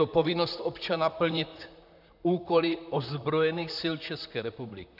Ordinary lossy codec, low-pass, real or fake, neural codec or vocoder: AAC, 48 kbps; 5.4 kHz; fake; vocoder, 44.1 kHz, 128 mel bands, Pupu-Vocoder